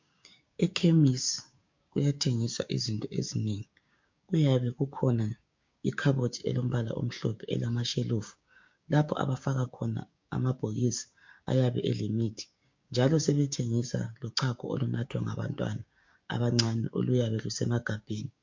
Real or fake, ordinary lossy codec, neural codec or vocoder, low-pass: fake; MP3, 48 kbps; codec, 16 kHz, 6 kbps, DAC; 7.2 kHz